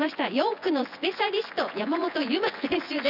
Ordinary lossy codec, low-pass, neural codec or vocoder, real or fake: none; 5.4 kHz; vocoder, 24 kHz, 100 mel bands, Vocos; fake